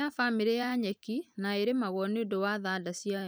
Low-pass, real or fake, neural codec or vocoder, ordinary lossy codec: none; fake; vocoder, 44.1 kHz, 128 mel bands every 512 samples, BigVGAN v2; none